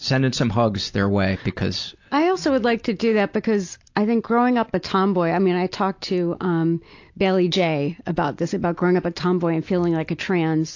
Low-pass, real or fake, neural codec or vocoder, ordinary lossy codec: 7.2 kHz; real; none; AAC, 48 kbps